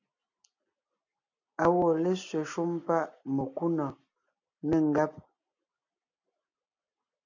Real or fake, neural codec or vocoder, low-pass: real; none; 7.2 kHz